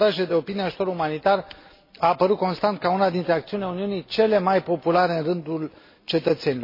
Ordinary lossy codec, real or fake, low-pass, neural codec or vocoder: MP3, 24 kbps; real; 5.4 kHz; none